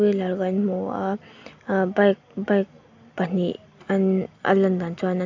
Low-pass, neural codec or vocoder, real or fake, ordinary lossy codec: 7.2 kHz; none; real; AAC, 48 kbps